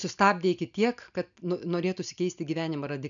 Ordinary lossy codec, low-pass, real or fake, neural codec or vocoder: MP3, 96 kbps; 7.2 kHz; real; none